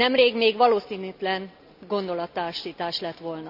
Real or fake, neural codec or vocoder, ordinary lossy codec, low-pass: real; none; none; 5.4 kHz